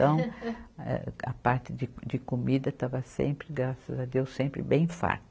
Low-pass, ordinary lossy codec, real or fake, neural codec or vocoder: none; none; real; none